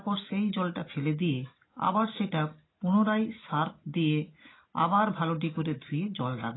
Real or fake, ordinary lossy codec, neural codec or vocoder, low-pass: real; AAC, 16 kbps; none; 7.2 kHz